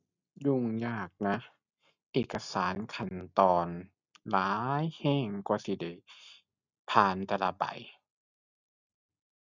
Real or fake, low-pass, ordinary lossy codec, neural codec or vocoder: real; 7.2 kHz; none; none